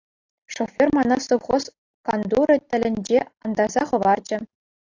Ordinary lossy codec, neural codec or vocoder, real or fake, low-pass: AAC, 48 kbps; none; real; 7.2 kHz